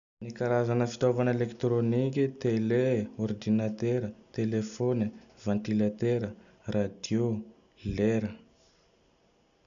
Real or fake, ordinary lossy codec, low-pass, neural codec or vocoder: real; AAC, 96 kbps; 7.2 kHz; none